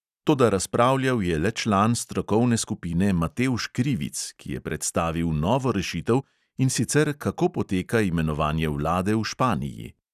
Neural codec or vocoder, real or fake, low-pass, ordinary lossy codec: none; real; 14.4 kHz; none